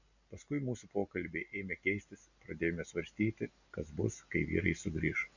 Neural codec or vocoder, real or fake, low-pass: none; real; 7.2 kHz